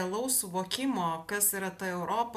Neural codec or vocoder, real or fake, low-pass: none; real; 14.4 kHz